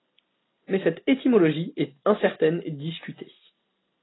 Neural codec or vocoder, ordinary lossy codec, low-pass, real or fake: none; AAC, 16 kbps; 7.2 kHz; real